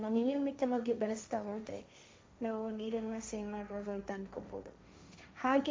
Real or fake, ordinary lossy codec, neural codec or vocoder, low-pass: fake; none; codec, 16 kHz, 1.1 kbps, Voila-Tokenizer; none